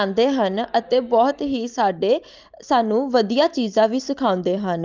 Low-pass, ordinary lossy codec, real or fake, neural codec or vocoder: 7.2 kHz; Opus, 24 kbps; real; none